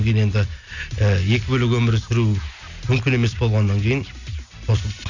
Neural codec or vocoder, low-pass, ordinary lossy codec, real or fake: none; 7.2 kHz; none; real